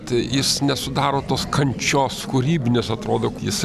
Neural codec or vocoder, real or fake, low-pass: none; real; 14.4 kHz